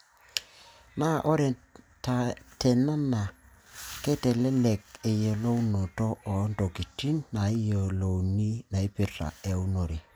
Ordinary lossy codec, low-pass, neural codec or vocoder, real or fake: none; none; none; real